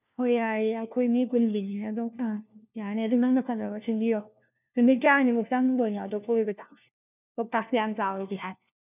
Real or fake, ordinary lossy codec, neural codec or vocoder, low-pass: fake; none; codec, 16 kHz, 1 kbps, FunCodec, trained on LibriTTS, 50 frames a second; 3.6 kHz